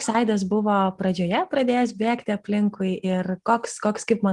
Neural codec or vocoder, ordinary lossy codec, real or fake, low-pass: none; Opus, 24 kbps; real; 10.8 kHz